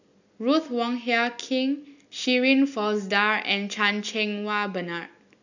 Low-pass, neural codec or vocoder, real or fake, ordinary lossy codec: 7.2 kHz; none; real; none